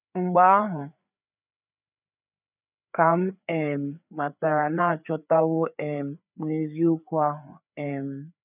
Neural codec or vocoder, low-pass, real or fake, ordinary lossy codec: codec, 16 kHz, 4 kbps, FreqCodec, larger model; 3.6 kHz; fake; none